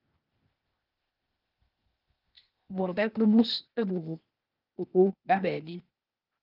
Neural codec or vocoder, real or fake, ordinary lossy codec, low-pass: codec, 16 kHz, 0.8 kbps, ZipCodec; fake; Opus, 24 kbps; 5.4 kHz